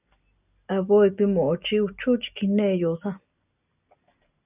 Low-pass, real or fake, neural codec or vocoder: 3.6 kHz; real; none